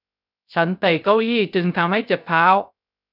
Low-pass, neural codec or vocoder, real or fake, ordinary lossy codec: 5.4 kHz; codec, 16 kHz, 0.3 kbps, FocalCodec; fake; none